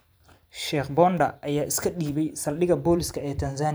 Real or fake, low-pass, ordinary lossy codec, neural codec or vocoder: fake; none; none; vocoder, 44.1 kHz, 128 mel bands every 512 samples, BigVGAN v2